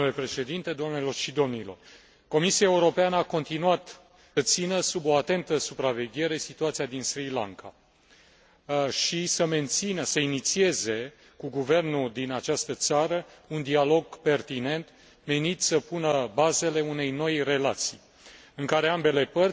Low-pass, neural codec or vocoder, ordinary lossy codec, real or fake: none; none; none; real